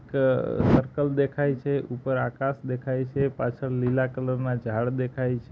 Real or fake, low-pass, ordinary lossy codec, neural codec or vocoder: real; none; none; none